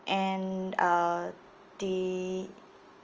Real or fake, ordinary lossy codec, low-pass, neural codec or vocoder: real; Opus, 24 kbps; 7.2 kHz; none